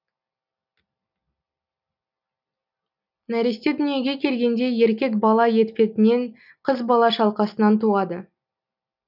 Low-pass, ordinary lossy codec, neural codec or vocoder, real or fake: 5.4 kHz; none; none; real